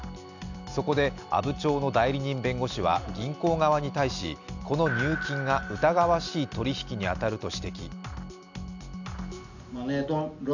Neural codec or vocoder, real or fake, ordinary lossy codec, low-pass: none; real; none; 7.2 kHz